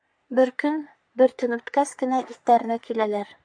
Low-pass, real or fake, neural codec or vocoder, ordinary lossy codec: 9.9 kHz; fake; codec, 44.1 kHz, 3.4 kbps, Pupu-Codec; MP3, 64 kbps